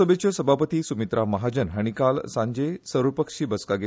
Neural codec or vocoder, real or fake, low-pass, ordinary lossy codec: none; real; none; none